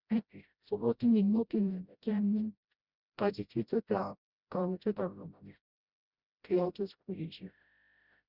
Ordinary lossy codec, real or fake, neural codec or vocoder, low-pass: Opus, 64 kbps; fake; codec, 16 kHz, 0.5 kbps, FreqCodec, smaller model; 5.4 kHz